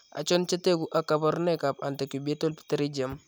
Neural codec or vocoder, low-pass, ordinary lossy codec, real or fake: none; none; none; real